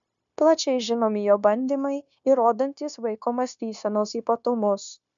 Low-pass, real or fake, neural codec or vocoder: 7.2 kHz; fake; codec, 16 kHz, 0.9 kbps, LongCat-Audio-Codec